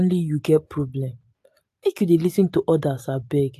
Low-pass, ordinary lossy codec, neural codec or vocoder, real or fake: 14.4 kHz; AAC, 64 kbps; none; real